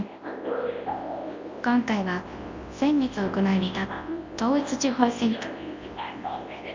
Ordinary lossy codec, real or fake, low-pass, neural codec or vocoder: MP3, 48 kbps; fake; 7.2 kHz; codec, 24 kHz, 0.9 kbps, WavTokenizer, large speech release